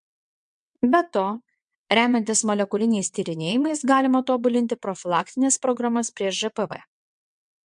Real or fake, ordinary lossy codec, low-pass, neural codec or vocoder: fake; MP3, 64 kbps; 9.9 kHz; vocoder, 22.05 kHz, 80 mel bands, WaveNeXt